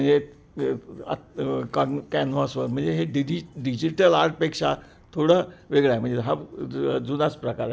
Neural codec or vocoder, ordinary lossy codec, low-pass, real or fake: none; none; none; real